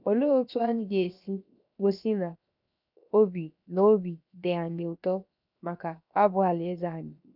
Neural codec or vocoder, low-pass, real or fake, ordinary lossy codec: codec, 16 kHz, 0.7 kbps, FocalCodec; 5.4 kHz; fake; none